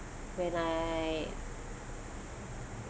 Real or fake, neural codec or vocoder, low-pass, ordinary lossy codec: real; none; none; none